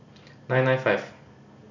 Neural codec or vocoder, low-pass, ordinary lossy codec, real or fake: none; 7.2 kHz; none; real